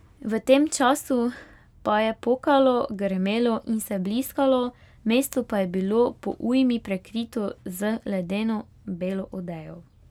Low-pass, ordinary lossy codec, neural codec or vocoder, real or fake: 19.8 kHz; none; none; real